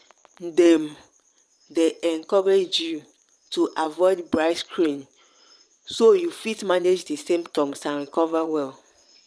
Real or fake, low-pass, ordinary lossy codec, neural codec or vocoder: fake; none; none; vocoder, 22.05 kHz, 80 mel bands, Vocos